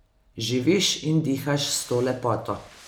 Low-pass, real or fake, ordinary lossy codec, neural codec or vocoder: none; real; none; none